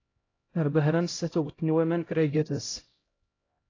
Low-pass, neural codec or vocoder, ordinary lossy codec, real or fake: 7.2 kHz; codec, 16 kHz, 0.5 kbps, X-Codec, HuBERT features, trained on LibriSpeech; AAC, 32 kbps; fake